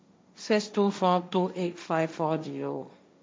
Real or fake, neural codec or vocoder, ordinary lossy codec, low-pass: fake; codec, 16 kHz, 1.1 kbps, Voila-Tokenizer; none; none